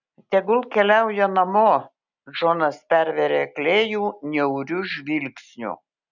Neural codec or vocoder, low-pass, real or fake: none; 7.2 kHz; real